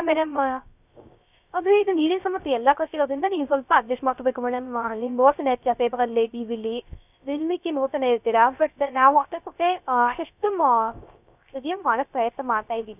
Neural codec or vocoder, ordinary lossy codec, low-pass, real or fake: codec, 16 kHz, 0.3 kbps, FocalCodec; none; 3.6 kHz; fake